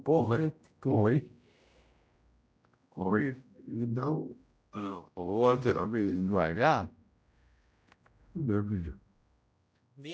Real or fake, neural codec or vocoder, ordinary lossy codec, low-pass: fake; codec, 16 kHz, 0.5 kbps, X-Codec, HuBERT features, trained on general audio; none; none